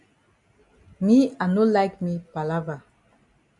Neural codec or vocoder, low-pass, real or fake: none; 10.8 kHz; real